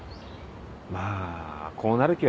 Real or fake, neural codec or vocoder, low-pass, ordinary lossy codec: real; none; none; none